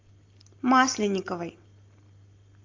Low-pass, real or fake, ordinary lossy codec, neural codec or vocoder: 7.2 kHz; real; Opus, 24 kbps; none